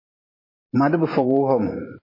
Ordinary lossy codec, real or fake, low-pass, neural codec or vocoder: MP3, 24 kbps; fake; 5.4 kHz; vocoder, 44.1 kHz, 128 mel bands every 512 samples, BigVGAN v2